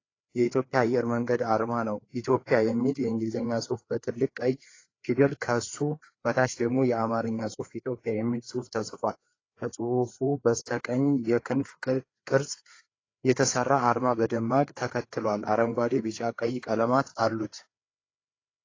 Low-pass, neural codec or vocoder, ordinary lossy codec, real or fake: 7.2 kHz; codec, 16 kHz, 4 kbps, FreqCodec, larger model; AAC, 32 kbps; fake